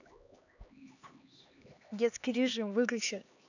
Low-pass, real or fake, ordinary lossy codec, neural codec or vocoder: 7.2 kHz; fake; none; codec, 16 kHz, 2 kbps, X-Codec, HuBERT features, trained on LibriSpeech